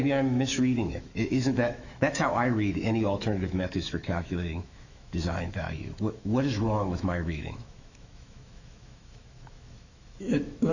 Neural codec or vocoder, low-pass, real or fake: autoencoder, 48 kHz, 128 numbers a frame, DAC-VAE, trained on Japanese speech; 7.2 kHz; fake